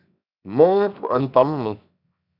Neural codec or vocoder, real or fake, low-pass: codec, 16 kHz, 0.7 kbps, FocalCodec; fake; 5.4 kHz